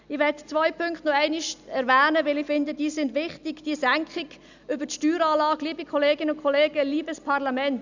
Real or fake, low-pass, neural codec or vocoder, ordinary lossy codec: real; 7.2 kHz; none; none